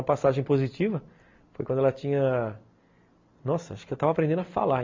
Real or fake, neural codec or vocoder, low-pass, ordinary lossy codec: real; none; 7.2 kHz; MP3, 48 kbps